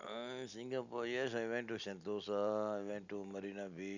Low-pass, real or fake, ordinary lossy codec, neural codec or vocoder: 7.2 kHz; real; none; none